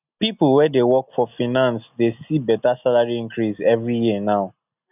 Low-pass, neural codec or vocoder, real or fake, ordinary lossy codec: 3.6 kHz; none; real; none